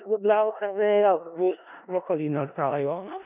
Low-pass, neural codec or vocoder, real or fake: 3.6 kHz; codec, 16 kHz in and 24 kHz out, 0.4 kbps, LongCat-Audio-Codec, four codebook decoder; fake